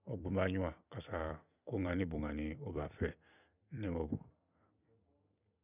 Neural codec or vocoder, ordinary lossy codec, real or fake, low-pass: vocoder, 44.1 kHz, 128 mel bands every 256 samples, BigVGAN v2; none; fake; 3.6 kHz